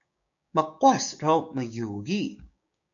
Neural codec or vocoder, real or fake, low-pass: codec, 16 kHz, 6 kbps, DAC; fake; 7.2 kHz